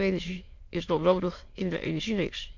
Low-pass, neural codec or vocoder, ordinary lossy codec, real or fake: 7.2 kHz; autoencoder, 22.05 kHz, a latent of 192 numbers a frame, VITS, trained on many speakers; MP3, 48 kbps; fake